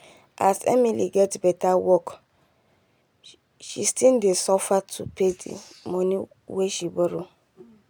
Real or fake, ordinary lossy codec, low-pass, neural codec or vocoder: real; none; none; none